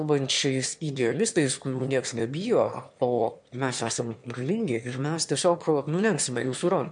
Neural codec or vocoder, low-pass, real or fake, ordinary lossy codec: autoencoder, 22.05 kHz, a latent of 192 numbers a frame, VITS, trained on one speaker; 9.9 kHz; fake; MP3, 64 kbps